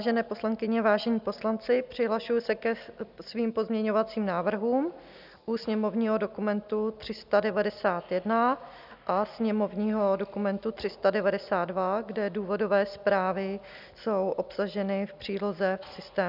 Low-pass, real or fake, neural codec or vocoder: 5.4 kHz; real; none